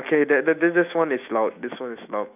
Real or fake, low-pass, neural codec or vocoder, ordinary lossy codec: fake; 3.6 kHz; autoencoder, 48 kHz, 128 numbers a frame, DAC-VAE, trained on Japanese speech; none